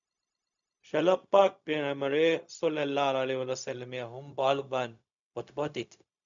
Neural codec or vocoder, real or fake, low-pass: codec, 16 kHz, 0.4 kbps, LongCat-Audio-Codec; fake; 7.2 kHz